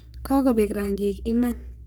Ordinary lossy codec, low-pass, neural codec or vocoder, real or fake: none; none; codec, 44.1 kHz, 3.4 kbps, Pupu-Codec; fake